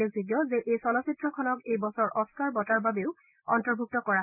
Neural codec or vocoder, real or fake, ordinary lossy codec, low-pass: none; real; none; 3.6 kHz